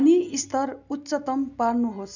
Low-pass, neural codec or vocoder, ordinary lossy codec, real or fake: 7.2 kHz; none; none; real